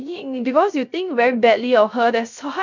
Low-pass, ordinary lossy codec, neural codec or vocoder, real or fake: 7.2 kHz; none; codec, 16 kHz, 0.3 kbps, FocalCodec; fake